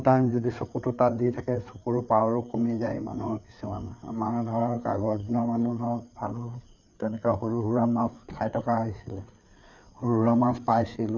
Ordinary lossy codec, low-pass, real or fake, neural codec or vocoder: none; 7.2 kHz; fake; codec, 16 kHz, 4 kbps, FreqCodec, larger model